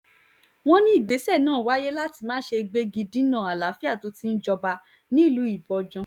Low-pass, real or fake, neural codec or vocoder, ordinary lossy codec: 19.8 kHz; fake; codec, 44.1 kHz, 7.8 kbps, DAC; none